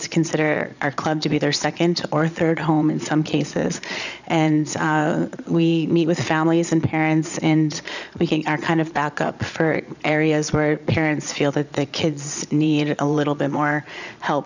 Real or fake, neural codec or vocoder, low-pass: fake; vocoder, 22.05 kHz, 80 mel bands, Vocos; 7.2 kHz